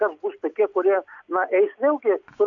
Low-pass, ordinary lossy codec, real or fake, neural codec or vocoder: 7.2 kHz; AAC, 48 kbps; real; none